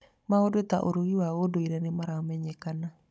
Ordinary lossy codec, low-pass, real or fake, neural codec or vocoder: none; none; fake; codec, 16 kHz, 16 kbps, FunCodec, trained on Chinese and English, 50 frames a second